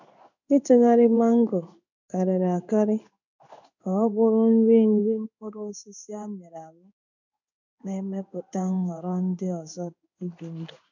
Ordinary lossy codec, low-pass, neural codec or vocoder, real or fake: none; 7.2 kHz; codec, 16 kHz in and 24 kHz out, 1 kbps, XY-Tokenizer; fake